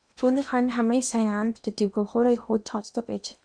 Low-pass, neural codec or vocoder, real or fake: 9.9 kHz; codec, 16 kHz in and 24 kHz out, 0.8 kbps, FocalCodec, streaming, 65536 codes; fake